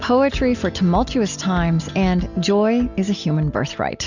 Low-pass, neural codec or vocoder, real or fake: 7.2 kHz; none; real